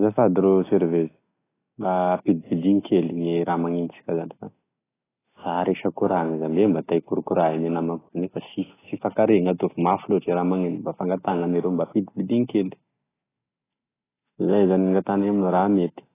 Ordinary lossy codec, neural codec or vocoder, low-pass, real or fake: AAC, 16 kbps; none; 3.6 kHz; real